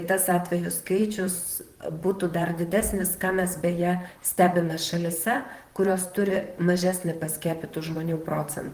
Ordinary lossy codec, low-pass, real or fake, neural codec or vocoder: Opus, 24 kbps; 14.4 kHz; fake; vocoder, 44.1 kHz, 128 mel bands, Pupu-Vocoder